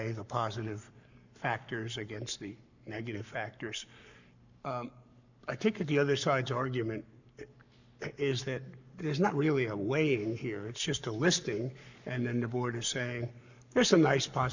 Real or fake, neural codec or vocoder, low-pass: fake; codec, 44.1 kHz, 7.8 kbps, Pupu-Codec; 7.2 kHz